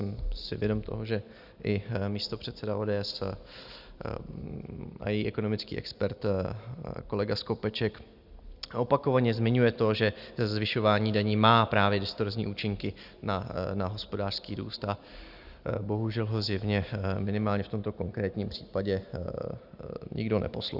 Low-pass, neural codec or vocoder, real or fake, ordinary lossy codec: 5.4 kHz; none; real; Opus, 64 kbps